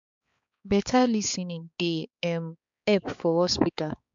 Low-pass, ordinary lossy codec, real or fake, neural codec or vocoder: 7.2 kHz; MP3, 64 kbps; fake; codec, 16 kHz, 4 kbps, X-Codec, HuBERT features, trained on balanced general audio